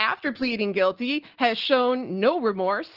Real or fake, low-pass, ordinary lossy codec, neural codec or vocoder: real; 5.4 kHz; Opus, 16 kbps; none